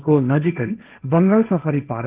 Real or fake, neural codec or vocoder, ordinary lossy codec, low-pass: fake; codec, 16 kHz, 1.1 kbps, Voila-Tokenizer; Opus, 32 kbps; 3.6 kHz